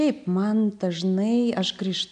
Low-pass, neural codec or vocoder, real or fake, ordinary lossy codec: 9.9 kHz; none; real; MP3, 96 kbps